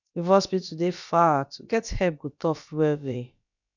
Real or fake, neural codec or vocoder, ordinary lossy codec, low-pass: fake; codec, 16 kHz, about 1 kbps, DyCAST, with the encoder's durations; none; 7.2 kHz